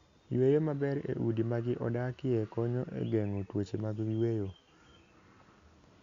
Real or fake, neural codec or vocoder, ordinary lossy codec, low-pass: real; none; Opus, 64 kbps; 7.2 kHz